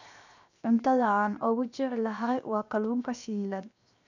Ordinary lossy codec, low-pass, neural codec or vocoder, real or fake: none; 7.2 kHz; codec, 16 kHz, 0.7 kbps, FocalCodec; fake